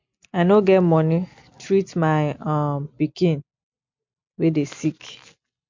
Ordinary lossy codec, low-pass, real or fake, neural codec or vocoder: MP3, 48 kbps; 7.2 kHz; real; none